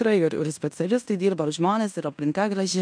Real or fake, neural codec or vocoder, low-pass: fake; codec, 16 kHz in and 24 kHz out, 0.9 kbps, LongCat-Audio-Codec, fine tuned four codebook decoder; 9.9 kHz